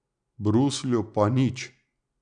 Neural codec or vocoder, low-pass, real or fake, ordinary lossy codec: none; 9.9 kHz; real; none